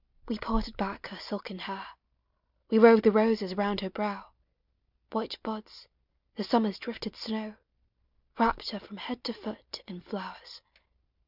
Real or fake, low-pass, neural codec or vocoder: real; 5.4 kHz; none